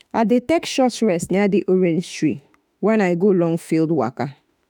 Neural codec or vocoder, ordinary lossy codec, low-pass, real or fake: autoencoder, 48 kHz, 32 numbers a frame, DAC-VAE, trained on Japanese speech; none; none; fake